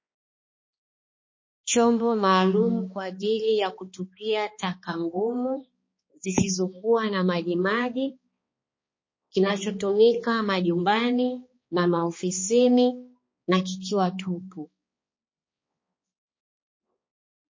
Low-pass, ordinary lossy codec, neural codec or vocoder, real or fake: 7.2 kHz; MP3, 32 kbps; codec, 16 kHz, 2 kbps, X-Codec, HuBERT features, trained on balanced general audio; fake